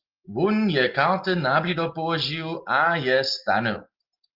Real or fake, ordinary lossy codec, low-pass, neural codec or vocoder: real; Opus, 24 kbps; 5.4 kHz; none